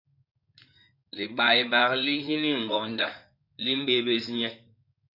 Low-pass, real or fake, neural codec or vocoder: 5.4 kHz; fake; codec, 16 kHz in and 24 kHz out, 2.2 kbps, FireRedTTS-2 codec